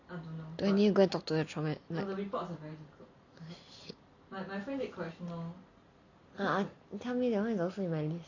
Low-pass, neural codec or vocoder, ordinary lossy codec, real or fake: 7.2 kHz; none; none; real